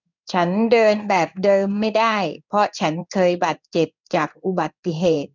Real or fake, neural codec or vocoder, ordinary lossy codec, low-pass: fake; codec, 16 kHz in and 24 kHz out, 1 kbps, XY-Tokenizer; none; 7.2 kHz